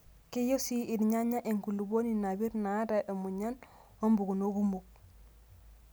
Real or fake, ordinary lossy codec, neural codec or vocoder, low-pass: real; none; none; none